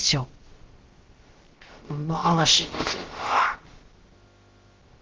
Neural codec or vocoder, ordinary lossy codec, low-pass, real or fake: codec, 16 kHz, about 1 kbps, DyCAST, with the encoder's durations; Opus, 16 kbps; 7.2 kHz; fake